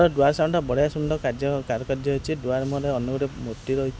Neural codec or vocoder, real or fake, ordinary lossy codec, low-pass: none; real; none; none